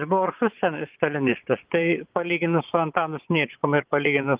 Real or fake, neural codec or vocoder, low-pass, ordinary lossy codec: fake; vocoder, 22.05 kHz, 80 mel bands, Vocos; 3.6 kHz; Opus, 32 kbps